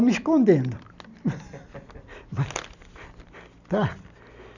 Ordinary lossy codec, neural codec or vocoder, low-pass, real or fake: none; none; 7.2 kHz; real